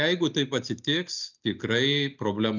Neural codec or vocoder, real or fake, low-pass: none; real; 7.2 kHz